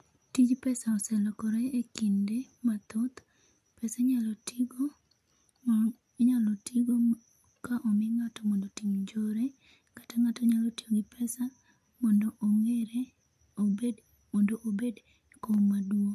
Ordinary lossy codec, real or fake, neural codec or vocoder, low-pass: none; real; none; 14.4 kHz